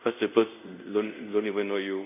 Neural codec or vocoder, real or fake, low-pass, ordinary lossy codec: codec, 24 kHz, 0.5 kbps, DualCodec; fake; 3.6 kHz; none